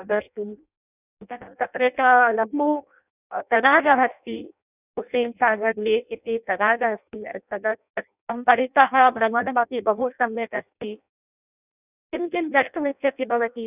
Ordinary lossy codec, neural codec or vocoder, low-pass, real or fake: none; codec, 16 kHz in and 24 kHz out, 0.6 kbps, FireRedTTS-2 codec; 3.6 kHz; fake